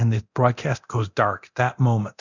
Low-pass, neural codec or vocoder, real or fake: 7.2 kHz; codec, 24 kHz, 0.9 kbps, DualCodec; fake